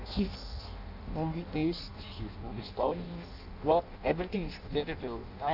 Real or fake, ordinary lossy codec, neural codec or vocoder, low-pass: fake; none; codec, 16 kHz in and 24 kHz out, 0.6 kbps, FireRedTTS-2 codec; 5.4 kHz